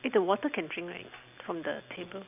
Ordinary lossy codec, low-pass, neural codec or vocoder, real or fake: none; 3.6 kHz; none; real